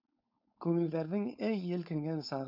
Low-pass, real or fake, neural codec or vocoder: 5.4 kHz; fake; codec, 16 kHz, 4.8 kbps, FACodec